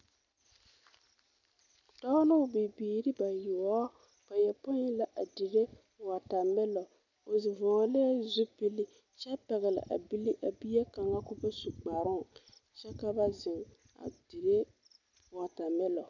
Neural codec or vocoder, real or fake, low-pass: none; real; 7.2 kHz